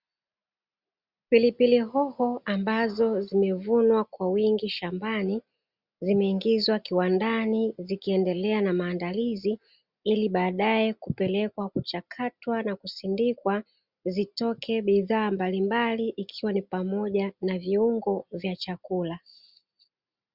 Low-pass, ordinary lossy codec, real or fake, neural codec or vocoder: 5.4 kHz; Opus, 64 kbps; real; none